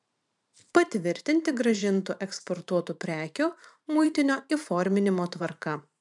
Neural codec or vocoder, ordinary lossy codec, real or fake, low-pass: vocoder, 44.1 kHz, 128 mel bands every 512 samples, BigVGAN v2; MP3, 96 kbps; fake; 10.8 kHz